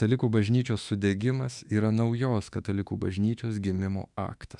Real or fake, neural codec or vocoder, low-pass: fake; autoencoder, 48 kHz, 32 numbers a frame, DAC-VAE, trained on Japanese speech; 10.8 kHz